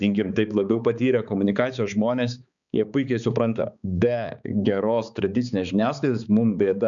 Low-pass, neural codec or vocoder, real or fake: 7.2 kHz; codec, 16 kHz, 4 kbps, X-Codec, HuBERT features, trained on balanced general audio; fake